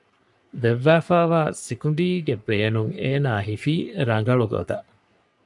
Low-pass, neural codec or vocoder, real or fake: 10.8 kHz; codec, 44.1 kHz, 3.4 kbps, Pupu-Codec; fake